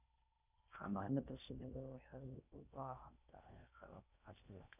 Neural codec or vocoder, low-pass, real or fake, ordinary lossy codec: codec, 16 kHz in and 24 kHz out, 0.6 kbps, FocalCodec, streaming, 4096 codes; 3.6 kHz; fake; none